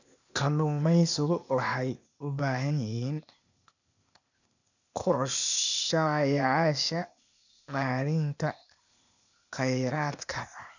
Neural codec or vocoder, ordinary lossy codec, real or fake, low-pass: codec, 16 kHz, 0.8 kbps, ZipCodec; none; fake; 7.2 kHz